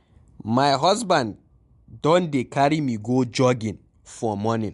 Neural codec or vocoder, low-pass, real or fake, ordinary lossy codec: none; 10.8 kHz; real; MP3, 64 kbps